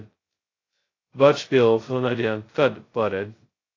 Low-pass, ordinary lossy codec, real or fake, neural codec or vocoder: 7.2 kHz; AAC, 32 kbps; fake; codec, 16 kHz, 0.2 kbps, FocalCodec